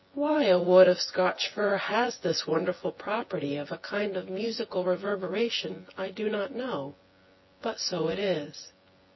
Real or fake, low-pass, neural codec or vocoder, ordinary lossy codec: fake; 7.2 kHz; vocoder, 24 kHz, 100 mel bands, Vocos; MP3, 24 kbps